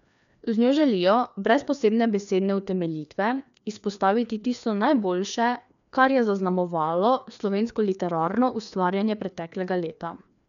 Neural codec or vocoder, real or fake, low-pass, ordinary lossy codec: codec, 16 kHz, 2 kbps, FreqCodec, larger model; fake; 7.2 kHz; none